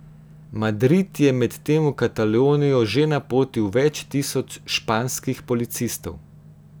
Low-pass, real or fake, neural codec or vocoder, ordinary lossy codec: none; real; none; none